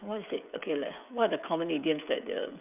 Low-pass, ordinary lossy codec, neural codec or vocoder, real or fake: 3.6 kHz; none; codec, 16 kHz, 16 kbps, FreqCodec, smaller model; fake